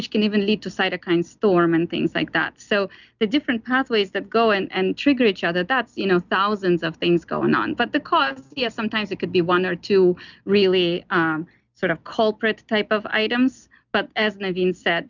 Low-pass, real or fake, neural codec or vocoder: 7.2 kHz; real; none